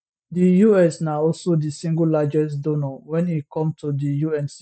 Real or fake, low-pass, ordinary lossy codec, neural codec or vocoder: real; none; none; none